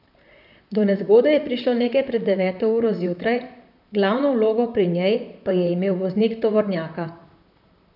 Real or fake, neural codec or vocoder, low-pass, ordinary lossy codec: fake; vocoder, 44.1 kHz, 128 mel bands, Pupu-Vocoder; 5.4 kHz; none